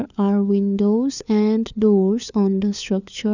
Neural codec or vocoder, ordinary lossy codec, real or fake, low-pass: codec, 16 kHz, 4 kbps, FunCodec, trained on LibriTTS, 50 frames a second; none; fake; 7.2 kHz